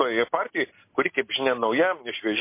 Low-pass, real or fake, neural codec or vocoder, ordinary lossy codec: 3.6 kHz; real; none; MP3, 24 kbps